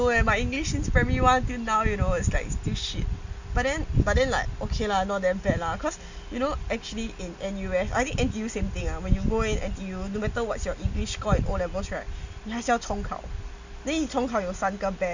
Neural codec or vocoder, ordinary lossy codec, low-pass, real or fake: none; Opus, 64 kbps; 7.2 kHz; real